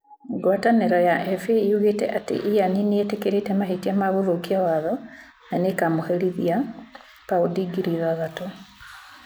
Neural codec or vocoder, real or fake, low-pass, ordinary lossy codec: none; real; none; none